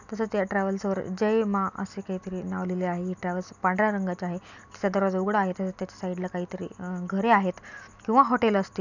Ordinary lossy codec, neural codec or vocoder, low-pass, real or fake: none; none; 7.2 kHz; real